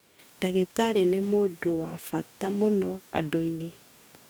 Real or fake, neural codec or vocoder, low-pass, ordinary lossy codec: fake; codec, 44.1 kHz, 2.6 kbps, DAC; none; none